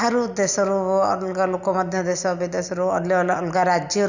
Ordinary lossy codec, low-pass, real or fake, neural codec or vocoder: none; 7.2 kHz; real; none